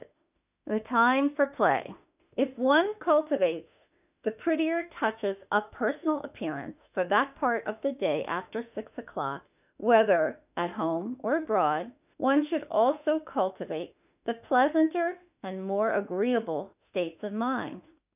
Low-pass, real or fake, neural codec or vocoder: 3.6 kHz; fake; autoencoder, 48 kHz, 32 numbers a frame, DAC-VAE, trained on Japanese speech